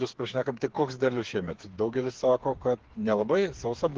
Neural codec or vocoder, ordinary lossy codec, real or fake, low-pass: codec, 16 kHz, 4 kbps, FreqCodec, smaller model; Opus, 16 kbps; fake; 7.2 kHz